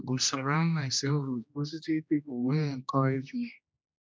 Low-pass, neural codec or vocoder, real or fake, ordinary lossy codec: none; codec, 16 kHz, 1 kbps, X-Codec, HuBERT features, trained on general audio; fake; none